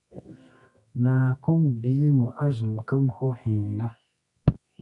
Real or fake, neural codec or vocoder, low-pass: fake; codec, 24 kHz, 0.9 kbps, WavTokenizer, medium music audio release; 10.8 kHz